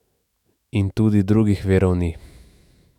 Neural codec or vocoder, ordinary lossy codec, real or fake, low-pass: autoencoder, 48 kHz, 128 numbers a frame, DAC-VAE, trained on Japanese speech; none; fake; 19.8 kHz